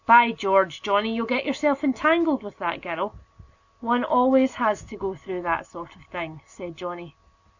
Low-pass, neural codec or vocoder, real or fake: 7.2 kHz; none; real